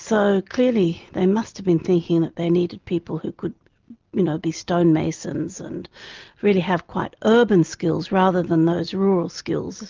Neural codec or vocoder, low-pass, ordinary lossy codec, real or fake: none; 7.2 kHz; Opus, 24 kbps; real